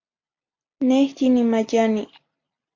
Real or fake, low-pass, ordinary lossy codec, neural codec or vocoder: real; 7.2 kHz; MP3, 48 kbps; none